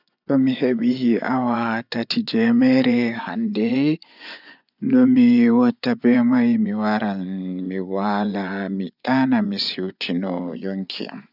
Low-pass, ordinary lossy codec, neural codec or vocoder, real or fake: 5.4 kHz; none; vocoder, 24 kHz, 100 mel bands, Vocos; fake